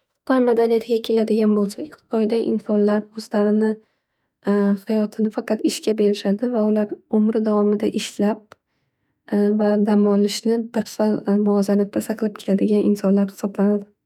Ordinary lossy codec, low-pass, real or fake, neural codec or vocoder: none; 19.8 kHz; fake; autoencoder, 48 kHz, 32 numbers a frame, DAC-VAE, trained on Japanese speech